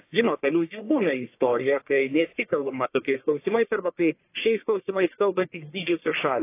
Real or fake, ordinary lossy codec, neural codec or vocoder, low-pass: fake; AAC, 24 kbps; codec, 44.1 kHz, 1.7 kbps, Pupu-Codec; 3.6 kHz